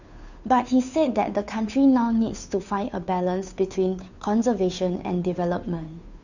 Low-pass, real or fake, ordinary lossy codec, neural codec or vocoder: 7.2 kHz; fake; none; codec, 16 kHz, 2 kbps, FunCodec, trained on Chinese and English, 25 frames a second